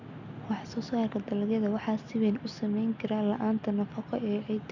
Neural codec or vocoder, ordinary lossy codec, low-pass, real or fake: none; none; 7.2 kHz; real